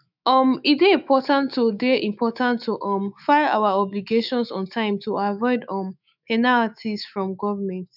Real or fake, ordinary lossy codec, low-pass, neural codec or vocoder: fake; none; 5.4 kHz; autoencoder, 48 kHz, 128 numbers a frame, DAC-VAE, trained on Japanese speech